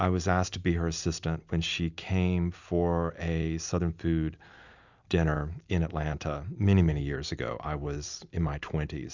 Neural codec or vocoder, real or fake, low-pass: none; real; 7.2 kHz